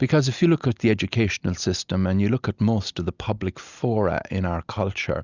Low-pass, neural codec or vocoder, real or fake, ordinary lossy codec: 7.2 kHz; none; real; Opus, 64 kbps